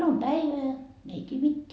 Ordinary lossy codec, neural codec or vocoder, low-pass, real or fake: none; none; none; real